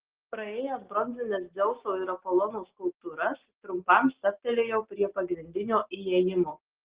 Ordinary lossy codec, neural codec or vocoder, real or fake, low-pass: Opus, 16 kbps; none; real; 3.6 kHz